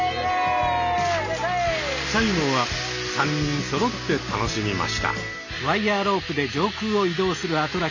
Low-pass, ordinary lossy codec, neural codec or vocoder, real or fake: 7.2 kHz; none; none; real